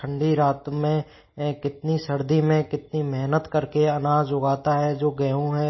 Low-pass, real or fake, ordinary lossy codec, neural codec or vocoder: 7.2 kHz; real; MP3, 24 kbps; none